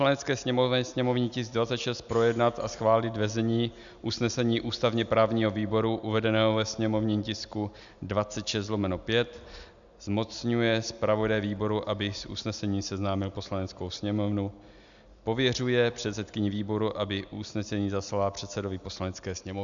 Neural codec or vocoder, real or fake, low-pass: none; real; 7.2 kHz